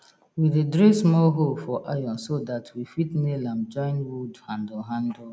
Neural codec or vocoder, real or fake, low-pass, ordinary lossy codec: none; real; none; none